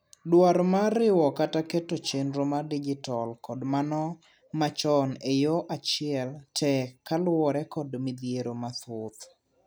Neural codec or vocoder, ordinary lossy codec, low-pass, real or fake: none; none; none; real